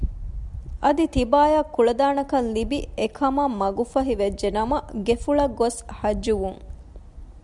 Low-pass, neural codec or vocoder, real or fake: 10.8 kHz; none; real